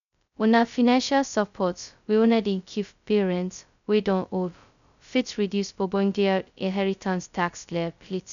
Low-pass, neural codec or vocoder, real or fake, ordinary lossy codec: 7.2 kHz; codec, 16 kHz, 0.2 kbps, FocalCodec; fake; none